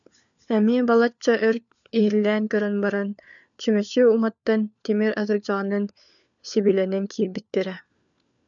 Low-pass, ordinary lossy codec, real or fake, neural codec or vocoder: 7.2 kHz; MP3, 96 kbps; fake; codec, 16 kHz, 4 kbps, FunCodec, trained on LibriTTS, 50 frames a second